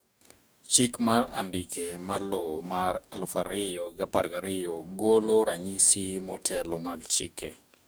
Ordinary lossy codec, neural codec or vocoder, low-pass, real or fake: none; codec, 44.1 kHz, 2.6 kbps, DAC; none; fake